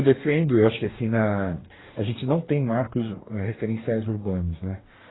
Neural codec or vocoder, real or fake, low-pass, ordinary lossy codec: codec, 44.1 kHz, 2.6 kbps, DAC; fake; 7.2 kHz; AAC, 16 kbps